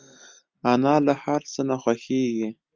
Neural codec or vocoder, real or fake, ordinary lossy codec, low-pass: none; real; Opus, 32 kbps; 7.2 kHz